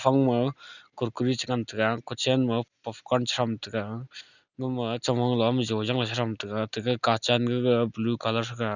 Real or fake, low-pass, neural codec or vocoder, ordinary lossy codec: real; 7.2 kHz; none; none